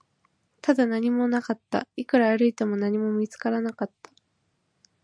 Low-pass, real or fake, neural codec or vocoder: 9.9 kHz; real; none